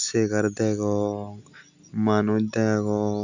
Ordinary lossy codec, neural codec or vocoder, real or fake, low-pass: none; none; real; 7.2 kHz